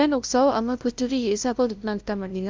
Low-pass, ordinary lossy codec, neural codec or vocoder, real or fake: 7.2 kHz; Opus, 24 kbps; codec, 16 kHz, 0.5 kbps, FunCodec, trained on LibriTTS, 25 frames a second; fake